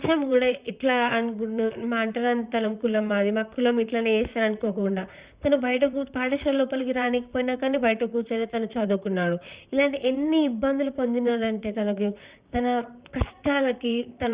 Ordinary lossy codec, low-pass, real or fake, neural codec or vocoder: Opus, 64 kbps; 3.6 kHz; fake; vocoder, 44.1 kHz, 128 mel bands, Pupu-Vocoder